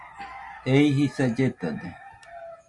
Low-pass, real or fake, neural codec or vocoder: 10.8 kHz; real; none